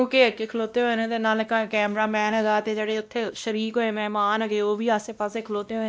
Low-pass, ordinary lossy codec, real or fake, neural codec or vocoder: none; none; fake; codec, 16 kHz, 1 kbps, X-Codec, WavLM features, trained on Multilingual LibriSpeech